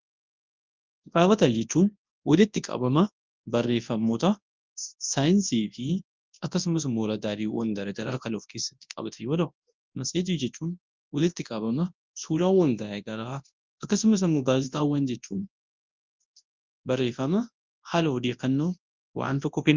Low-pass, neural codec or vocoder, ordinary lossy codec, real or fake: 7.2 kHz; codec, 24 kHz, 0.9 kbps, WavTokenizer, large speech release; Opus, 24 kbps; fake